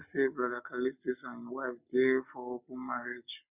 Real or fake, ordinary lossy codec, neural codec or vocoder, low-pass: real; none; none; 3.6 kHz